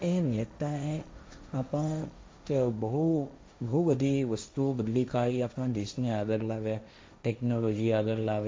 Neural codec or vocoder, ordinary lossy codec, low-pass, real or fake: codec, 16 kHz, 1.1 kbps, Voila-Tokenizer; none; none; fake